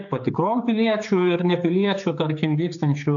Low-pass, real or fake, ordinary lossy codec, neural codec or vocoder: 7.2 kHz; fake; AAC, 64 kbps; codec, 16 kHz, 4 kbps, X-Codec, HuBERT features, trained on general audio